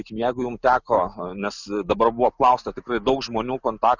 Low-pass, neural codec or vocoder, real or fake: 7.2 kHz; none; real